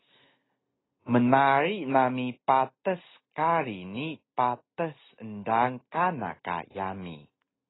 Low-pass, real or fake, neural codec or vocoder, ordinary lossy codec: 7.2 kHz; real; none; AAC, 16 kbps